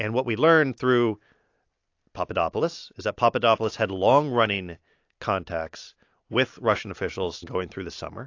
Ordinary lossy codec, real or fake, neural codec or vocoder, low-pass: AAC, 48 kbps; real; none; 7.2 kHz